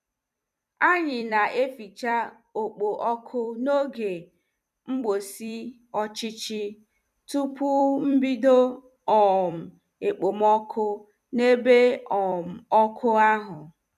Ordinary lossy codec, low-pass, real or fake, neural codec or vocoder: none; 14.4 kHz; fake; vocoder, 44.1 kHz, 128 mel bands every 256 samples, BigVGAN v2